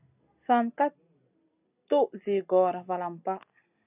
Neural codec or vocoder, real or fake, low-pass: none; real; 3.6 kHz